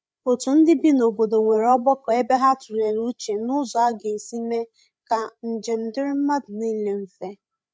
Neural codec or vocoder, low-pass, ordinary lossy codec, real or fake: codec, 16 kHz, 8 kbps, FreqCodec, larger model; none; none; fake